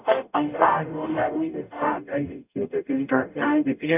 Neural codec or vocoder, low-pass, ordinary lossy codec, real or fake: codec, 44.1 kHz, 0.9 kbps, DAC; 3.6 kHz; none; fake